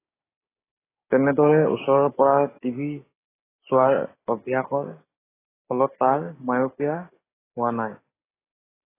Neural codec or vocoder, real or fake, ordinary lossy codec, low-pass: codec, 16 kHz, 6 kbps, DAC; fake; AAC, 16 kbps; 3.6 kHz